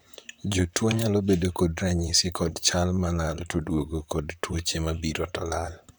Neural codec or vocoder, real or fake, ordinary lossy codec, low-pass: vocoder, 44.1 kHz, 128 mel bands, Pupu-Vocoder; fake; none; none